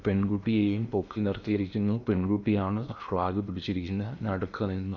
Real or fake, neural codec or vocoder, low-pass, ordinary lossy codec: fake; codec, 16 kHz in and 24 kHz out, 0.8 kbps, FocalCodec, streaming, 65536 codes; 7.2 kHz; none